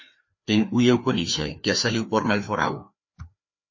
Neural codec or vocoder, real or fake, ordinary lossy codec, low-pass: codec, 16 kHz, 2 kbps, FreqCodec, larger model; fake; MP3, 32 kbps; 7.2 kHz